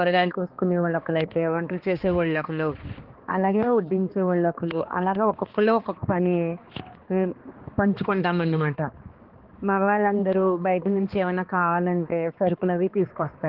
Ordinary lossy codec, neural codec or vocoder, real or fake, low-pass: Opus, 16 kbps; codec, 16 kHz, 2 kbps, X-Codec, HuBERT features, trained on balanced general audio; fake; 5.4 kHz